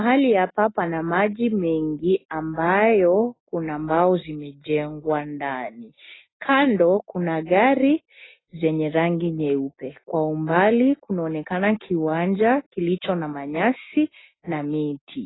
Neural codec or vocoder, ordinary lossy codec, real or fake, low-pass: none; AAC, 16 kbps; real; 7.2 kHz